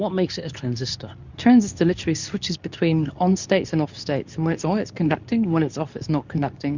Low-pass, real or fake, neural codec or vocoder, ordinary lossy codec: 7.2 kHz; fake; codec, 24 kHz, 0.9 kbps, WavTokenizer, medium speech release version 2; Opus, 64 kbps